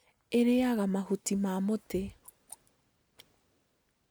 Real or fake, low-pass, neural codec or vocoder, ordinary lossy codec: real; none; none; none